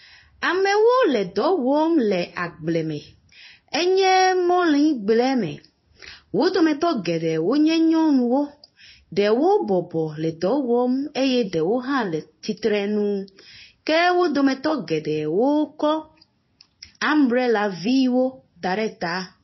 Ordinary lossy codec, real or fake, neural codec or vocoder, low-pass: MP3, 24 kbps; fake; codec, 16 kHz in and 24 kHz out, 1 kbps, XY-Tokenizer; 7.2 kHz